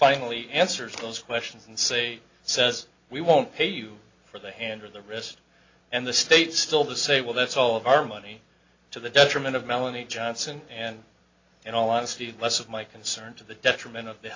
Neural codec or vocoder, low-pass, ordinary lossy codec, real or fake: none; 7.2 kHz; AAC, 48 kbps; real